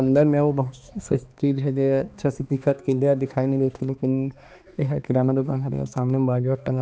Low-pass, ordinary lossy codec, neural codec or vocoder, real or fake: none; none; codec, 16 kHz, 2 kbps, X-Codec, HuBERT features, trained on balanced general audio; fake